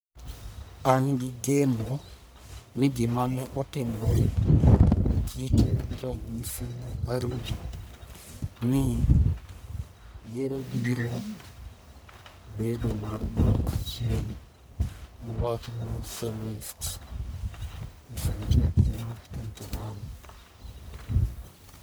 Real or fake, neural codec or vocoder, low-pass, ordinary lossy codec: fake; codec, 44.1 kHz, 1.7 kbps, Pupu-Codec; none; none